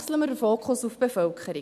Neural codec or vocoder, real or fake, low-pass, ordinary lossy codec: none; real; 14.4 kHz; none